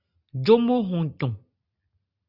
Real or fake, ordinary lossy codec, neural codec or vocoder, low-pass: real; Opus, 64 kbps; none; 5.4 kHz